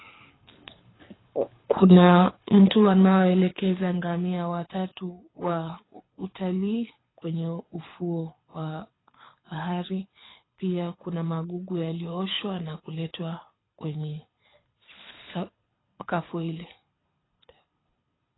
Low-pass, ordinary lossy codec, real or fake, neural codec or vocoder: 7.2 kHz; AAC, 16 kbps; fake; codec, 24 kHz, 6 kbps, HILCodec